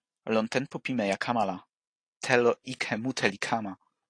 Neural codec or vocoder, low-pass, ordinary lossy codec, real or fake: none; 9.9 kHz; AAC, 48 kbps; real